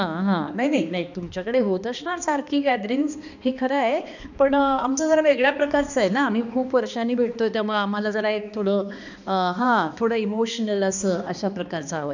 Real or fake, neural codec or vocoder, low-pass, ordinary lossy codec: fake; codec, 16 kHz, 2 kbps, X-Codec, HuBERT features, trained on balanced general audio; 7.2 kHz; none